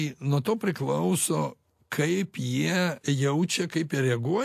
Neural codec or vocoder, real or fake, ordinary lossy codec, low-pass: none; real; AAC, 64 kbps; 14.4 kHz